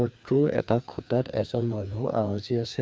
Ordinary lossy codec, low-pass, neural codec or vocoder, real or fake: none; none; codec, 16 kHz, 2 kbps, FreqCodec, larger model; fake